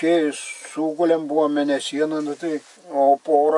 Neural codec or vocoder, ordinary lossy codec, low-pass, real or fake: none; AAC, 64 kbps; 10.8 kHz; real